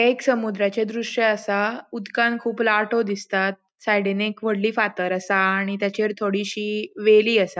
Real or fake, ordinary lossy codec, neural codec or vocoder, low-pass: real; none; none; none